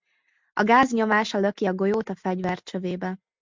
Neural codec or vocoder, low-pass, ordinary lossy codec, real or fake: none; 7.2 kHz; MP3, 64 kbps; real